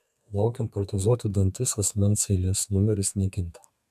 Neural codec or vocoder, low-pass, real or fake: codec, 32 kHz, 1.9 kbps, SNAC; 14.4 kHz; fake